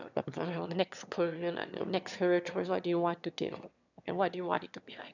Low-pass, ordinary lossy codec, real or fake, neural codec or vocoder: 7.2 kHz; none; fake; autoencoder, 22.05 kHz, a latent of 192 numbers a frame, VITS, trained on one speaker